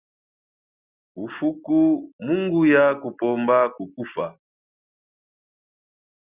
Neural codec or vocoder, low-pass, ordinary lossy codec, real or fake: none; 3.6 kHz; Opus, 64 kbps; real